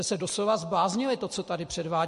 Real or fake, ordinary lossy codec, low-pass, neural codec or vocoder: real; MP3, 48 kbps; 14.4 kHz; none